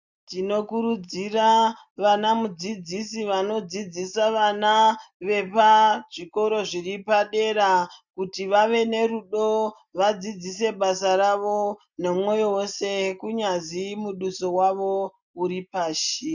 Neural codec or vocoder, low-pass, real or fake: none; 7.2 kHz; real